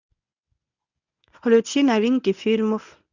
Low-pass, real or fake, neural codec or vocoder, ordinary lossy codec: 7.2 kHz; fake; codec, 24 kHz, 0.9 kbps, WavTokenizer, medium speech release version 1; none